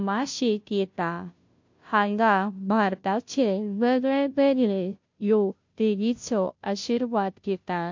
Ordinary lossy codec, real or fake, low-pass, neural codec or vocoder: MP3, 48 kbps; fake; 7.2 kHz; codec, 16 kHz, 0.5 kbps, FunCodec, trained on Chinese and English, 25 frames a second